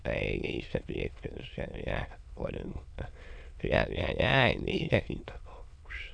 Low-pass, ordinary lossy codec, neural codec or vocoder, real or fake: 9.9 kHz; none; autoencoder, 22.05 kHz, a latent of 192 numbers a frame, VITS, trained on many speakers; fake